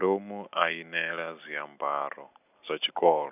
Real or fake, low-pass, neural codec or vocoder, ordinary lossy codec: real; 3.6 kHz; none; none